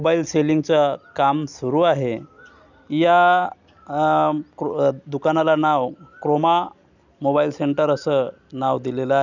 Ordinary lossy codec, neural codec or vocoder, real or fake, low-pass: none; none; real; 7.2 kHz